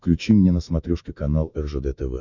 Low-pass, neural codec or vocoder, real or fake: 7.2 kHz; autoencoder, 48 kHz, 128 numbers a frame, DAC-VAE, trained on Japanese speech; fake